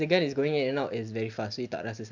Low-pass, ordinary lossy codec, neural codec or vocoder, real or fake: 7.2 kHz; none; none; real